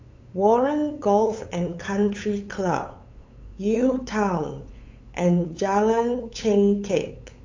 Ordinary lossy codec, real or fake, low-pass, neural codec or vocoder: AAC, 48 kbps; fake; 7.2 kHz; codec, 16 kHz, 8 kbps, FunCodec, trained on LibriTTS, 25 frames a second